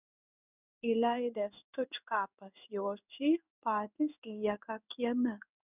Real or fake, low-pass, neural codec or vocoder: fake; 3.6 kHz; codec, 16 kHz in and 24 kHz out, 1 kbps, XY-Tokenizer